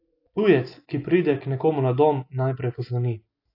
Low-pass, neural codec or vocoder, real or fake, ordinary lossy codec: 5.4 kHz; none; real; AAC, 32 kbps